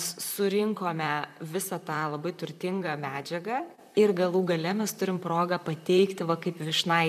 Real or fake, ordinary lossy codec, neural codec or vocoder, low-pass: fake; MP3, 96 kbps; vocoder, 44.1 kHz, 128 mel bands, Pupu-Vocoder; 14.4 kHz